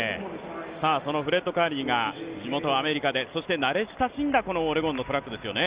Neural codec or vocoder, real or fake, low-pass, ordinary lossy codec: none; real; 3.6 kHz; Opus, 32 kbps